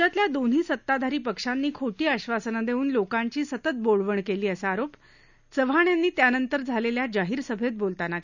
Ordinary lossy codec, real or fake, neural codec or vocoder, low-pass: none; real; none; 7.2 kHz